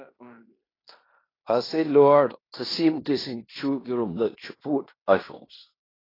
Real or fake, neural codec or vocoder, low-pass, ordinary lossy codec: fake; codec, 16 kHz in and 24 kHz out, 0.9 kbps, LongCat-Audio-Codec, fine tuned four codebook decoder; 5.4 kHz; AAC, 24 kbps